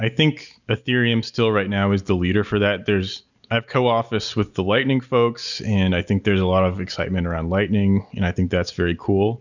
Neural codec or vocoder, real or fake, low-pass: none; real; 7.2 kHz